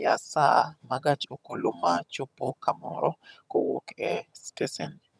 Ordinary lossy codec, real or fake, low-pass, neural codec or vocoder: none; fake; none; vocoder, 22.05 kHz, 80 mel bands, HiFi-GAN